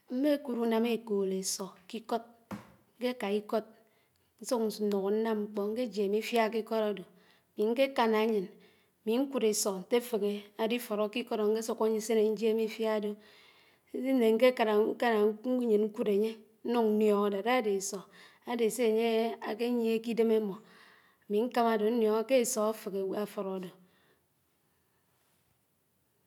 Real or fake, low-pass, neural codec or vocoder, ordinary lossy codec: real; 19.8 kHz; none; none